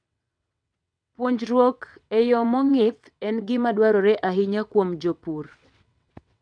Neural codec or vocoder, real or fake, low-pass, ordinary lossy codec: vocoder, 22.05 kHz, 80 mel bands, WaveNeXt; fake; 9.9 kHz; none